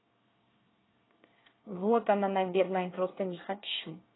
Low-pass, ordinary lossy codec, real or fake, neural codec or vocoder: 7.2 kHz; AAC, 16 kbps; fake; codec, 24 kHz, 1 kbps, SNAC